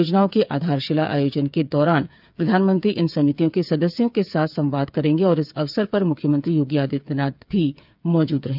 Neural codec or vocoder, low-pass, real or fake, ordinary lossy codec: codec, 16 kHz, 8 kbps, FreqCodec, smaller model; 5.4 kHz; fake; none